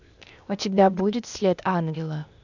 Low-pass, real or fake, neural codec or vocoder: 7.2 kHz; fake; codec, 16 kHz, 0.8 kbps, ZipCodec